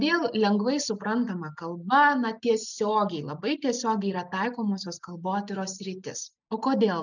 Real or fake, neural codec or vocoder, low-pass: real; none; 7.2 kHz